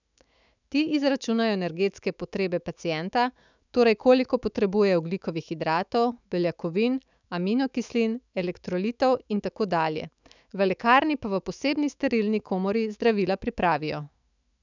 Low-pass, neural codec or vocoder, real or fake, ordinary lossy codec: 7.2 kHz; autoencoder, 48 kHz, 128 numbers a frame, DAC-VAE, trained on Japanese speech; fake; none